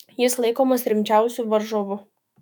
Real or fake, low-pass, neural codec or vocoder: fake; 19.8 kHz; autoencoder, 48 kHz, 128 numbers a frame, DAC-VAE, trained on Japanese speech